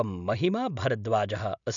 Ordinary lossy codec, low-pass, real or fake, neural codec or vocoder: none; 7.2 kHz; real; none